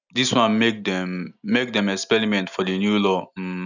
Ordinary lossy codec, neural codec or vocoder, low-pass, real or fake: none; none; 7.2 kHz; real